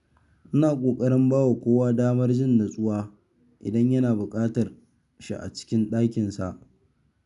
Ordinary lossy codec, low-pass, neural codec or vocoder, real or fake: none; 10.8 kHz; none; real